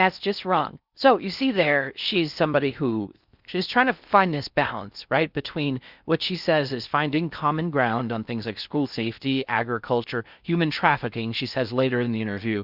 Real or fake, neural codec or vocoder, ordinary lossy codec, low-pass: fake; codec, 16 kHz in and 24 kHz out, 0.6 kbps, FocalCodec, streaming, 4096 codes; Opus, 64 kbps; 5.4 kHz